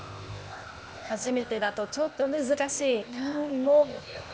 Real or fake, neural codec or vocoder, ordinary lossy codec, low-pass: fake; codec, 16 kHz, 0.8 kbps, ZipCodec; none; none